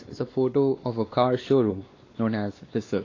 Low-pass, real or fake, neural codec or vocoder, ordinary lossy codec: 7.2 kHz; fake; codec, 16 kHz, 2 kbps, X-Codec, WavLM features, trained on Multilingual LibriSpeech; AAC, 32 kbps